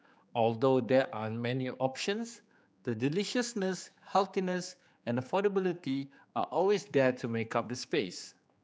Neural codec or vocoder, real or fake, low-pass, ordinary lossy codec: codec, 16 kHz, 4 kbps, X-Codec, HuBERT features, trained on general audio; fake; none; none